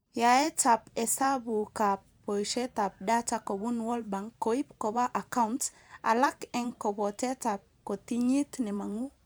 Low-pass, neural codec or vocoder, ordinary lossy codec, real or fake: none; vocoder, 44.1 kHz, 128 mel bands every 512 samples, BigVGAN v2; none; fake